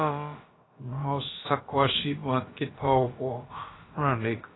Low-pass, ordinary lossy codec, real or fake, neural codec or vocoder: 7.2 kHz; AAC, 16 kbps; fake; codec, 16 kHz, about 1 kbps, DyCAST, with the encoder's durations